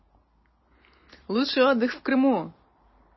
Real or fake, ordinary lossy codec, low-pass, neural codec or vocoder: real; MP3, 24 kbps; 7.2 kHz; none